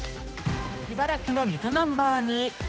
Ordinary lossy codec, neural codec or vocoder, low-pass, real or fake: none; codec, 16 kHz, 1 kbps, X-Codec, HuBERT features, trained on general audio; none; fake